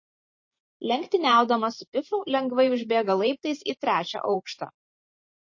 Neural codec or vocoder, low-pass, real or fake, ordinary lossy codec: vocoder, 44.1 kHz, 128 mel bands every 512 samples, BigVGAN v2; 7.2 kHz; fake; MP3, 32 kbps